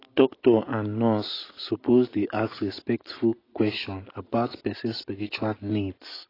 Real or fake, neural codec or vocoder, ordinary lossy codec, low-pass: real; none; AAC, 24 kbps; 5.4 kHz